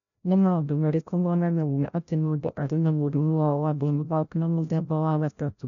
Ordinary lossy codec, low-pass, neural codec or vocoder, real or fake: none; 7.2 kHz; codec, 16 kHz, 0.5 kbps, FreqCodec, larger model; fake